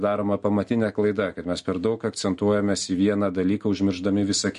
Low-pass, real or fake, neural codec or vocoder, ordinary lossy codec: 14.4 kHz; real; none; MP3, 48 kbps